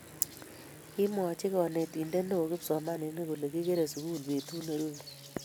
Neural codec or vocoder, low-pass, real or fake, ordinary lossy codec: none; none; real; none